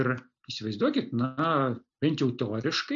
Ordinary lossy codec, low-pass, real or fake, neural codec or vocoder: AAC, 64 kbps; 7.2 kHz; real; none